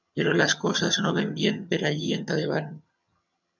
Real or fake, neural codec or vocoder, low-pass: fake; vocoder, 22.05 kHz, 80 mel bands, HiFi-GAN; 7.2 kHz